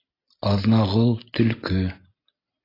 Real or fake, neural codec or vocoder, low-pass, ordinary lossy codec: real; none; 5.4 kHz; AAC, 24 kbps